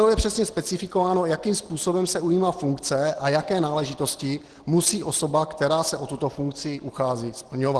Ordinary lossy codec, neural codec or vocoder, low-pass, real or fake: Opus, 16 kbps; none; 10.8 kHz; real